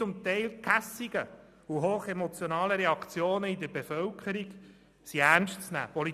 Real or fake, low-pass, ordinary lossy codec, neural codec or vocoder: real; 14.4 kHz; none; none